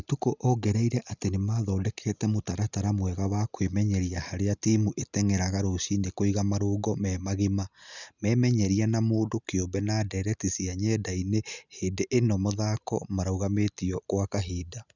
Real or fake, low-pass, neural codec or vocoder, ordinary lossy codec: real; 7.2 kHz; none; none